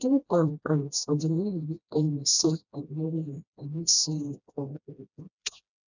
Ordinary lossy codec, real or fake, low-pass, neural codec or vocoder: none; fake; 7.2 kHz; codec, 16 kHz, 1 kbps, FreqCodec, smaller model